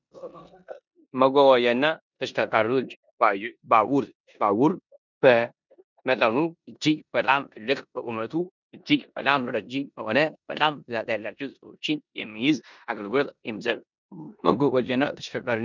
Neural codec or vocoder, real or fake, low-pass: codec, 16 kHz in and 24 kHz out, 0.9 kbps, LongCat-Audio-Codec, four codebook decoder; fake; 7.2 kHz